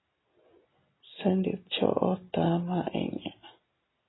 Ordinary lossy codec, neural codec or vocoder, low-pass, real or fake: AAC, 16 kbps; none; 7.2 kHz; real